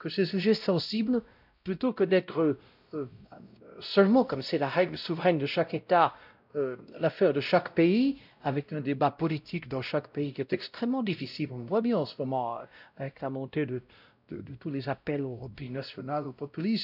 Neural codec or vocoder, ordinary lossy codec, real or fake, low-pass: codec, 16 kHz, 0.5 kbps, X-Codec, WavLM features, trained on Multilingual LibriSpeech; none; fake; 5.4 kHz